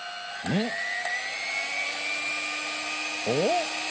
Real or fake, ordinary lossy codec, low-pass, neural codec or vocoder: real; none; none; none